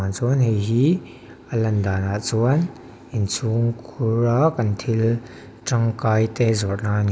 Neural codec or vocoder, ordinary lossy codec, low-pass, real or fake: none; none; none; real